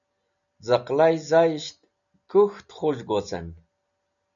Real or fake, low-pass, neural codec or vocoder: real; 7.2 kHz; none